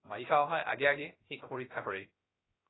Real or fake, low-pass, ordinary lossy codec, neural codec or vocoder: fake; 7.2 kHz; AAC, 16 kbps; codec, 16 kHz, 0.3 kbps, FocalCodec